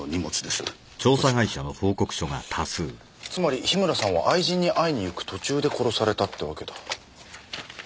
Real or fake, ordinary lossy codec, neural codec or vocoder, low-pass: real; none; none; none